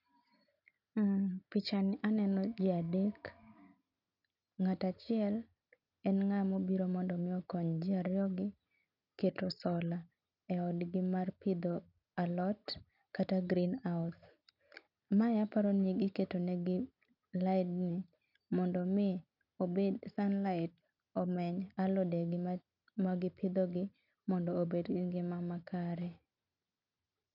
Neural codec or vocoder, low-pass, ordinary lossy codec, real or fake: none; 5.4 kHz; none; real